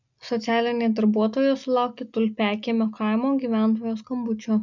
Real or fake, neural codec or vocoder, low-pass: real; none; 7.2 kHz